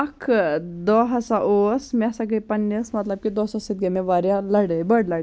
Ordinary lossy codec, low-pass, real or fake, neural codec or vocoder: none; none; real; none